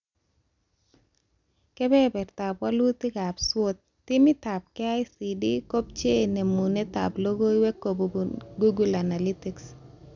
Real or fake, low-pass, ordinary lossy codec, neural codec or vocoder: real; 7.2 kHz; none; none